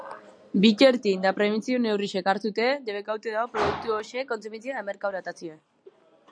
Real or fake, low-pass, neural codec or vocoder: real; 9.9 kHz; none